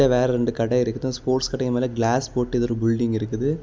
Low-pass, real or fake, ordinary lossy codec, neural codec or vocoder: none; real; none; none